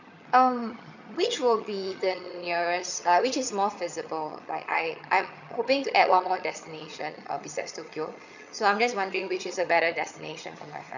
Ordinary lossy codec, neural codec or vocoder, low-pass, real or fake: none; vocoder, 22.05 kHz, 80 mel bands, HiFi-GAN; 7.2 kHz; fake